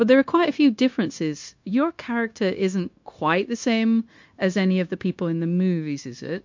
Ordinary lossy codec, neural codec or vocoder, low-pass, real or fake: MP3, 48 kbps; codec, 16 kHz, 0.9 kbps, LongCat-Audio-Codec; 7.2 kHz; fake